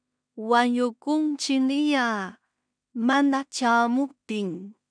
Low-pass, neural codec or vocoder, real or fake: 9.9 kHz; codec, 16 kHz in and 24 kHz out, 0.4 kbps, LongCat-Audio-Codec, two codebook decoder; fake